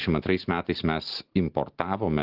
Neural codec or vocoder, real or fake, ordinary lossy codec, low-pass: none; real; Opus, 16 kbps; 5.4 kHz